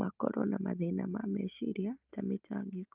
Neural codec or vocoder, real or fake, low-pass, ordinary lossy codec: none; real; 3.6 kHz; Opus, 32 kbps